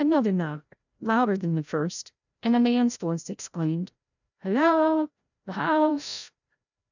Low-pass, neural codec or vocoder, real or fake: 7.2 kHz; codec, 16 kHz, 0.5 kbps, FreqCodec, larger model; fake